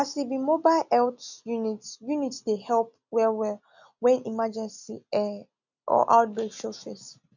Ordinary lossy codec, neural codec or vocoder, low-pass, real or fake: none; none; 7.2 kHz; real